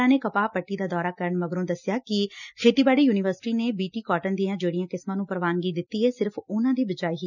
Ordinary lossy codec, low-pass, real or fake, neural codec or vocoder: none; 7.2 kHz; real; none